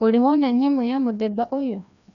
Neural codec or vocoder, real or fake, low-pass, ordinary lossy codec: codec, 16 kHz, 1 kbps, FreqCodec, larger model; fake; 7.2 kHz; none